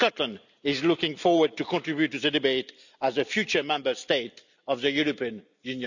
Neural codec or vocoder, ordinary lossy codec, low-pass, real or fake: none; none; 7.2 kHz; real